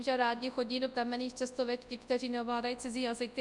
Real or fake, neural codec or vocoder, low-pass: fake; codec, 24 kHz, 0.9 kbps, WavTokenizer, large speech release; 10.8 kHz